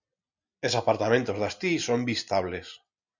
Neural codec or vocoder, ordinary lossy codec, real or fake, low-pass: none; Opus, 64 kbps; real; 7.2 kHz